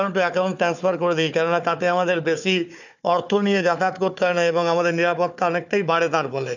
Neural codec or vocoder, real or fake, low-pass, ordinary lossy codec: codec, 16 kHz, 4 kbps, FunCodec, trained on Chinese and English, 50 frames a second; fake; 7.2 kHz; none